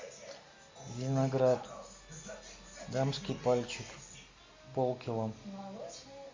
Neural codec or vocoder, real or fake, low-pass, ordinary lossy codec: none; real; 7.2 kHz; MP3, 48 kbps